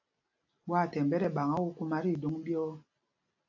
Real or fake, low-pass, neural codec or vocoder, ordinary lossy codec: real; 7.2 kHz; none; AAC, 48 kbps